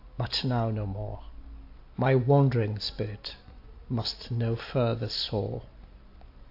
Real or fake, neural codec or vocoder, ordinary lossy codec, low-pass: real; none; AAC, 32 kbps; 5.4 kHz